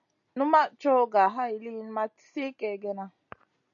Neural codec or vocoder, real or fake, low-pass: none; real; 7.2 kHz